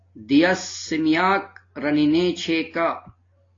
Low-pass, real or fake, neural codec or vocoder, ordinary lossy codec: 7.2 kHz; real; none; AAC, 32 kbps